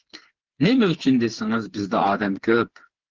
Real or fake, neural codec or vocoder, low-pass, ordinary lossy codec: fake; codec, 16 kHz, 4 kbps, FreqCodec, smaller model; 7.2 kHz; Opus, 16 kbps